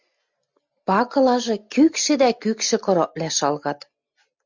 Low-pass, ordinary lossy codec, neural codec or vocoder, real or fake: 7.2 kHz; MP3, 64 kbps; none; real